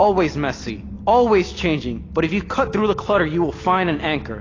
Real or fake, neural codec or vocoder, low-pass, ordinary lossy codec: real; none; 7.2 kHz; AAC, 32 kbps